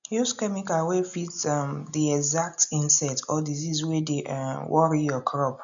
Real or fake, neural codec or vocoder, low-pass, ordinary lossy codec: real; none; 7.2 kHz; none